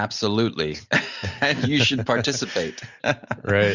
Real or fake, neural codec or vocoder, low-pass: real; none; 7.2 kHz